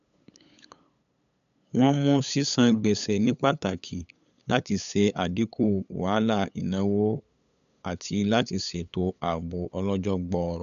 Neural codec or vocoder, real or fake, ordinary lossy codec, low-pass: codec, 16 kHz, 8 kbps, FunCodec, trained on LibriTTS, 25 frames a second; fake; none; 7.2 kHz